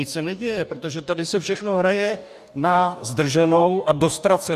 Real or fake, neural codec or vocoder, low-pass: fake; codec, 44.1 kHz, 2.6 kbps, DAC; 14.4 kHz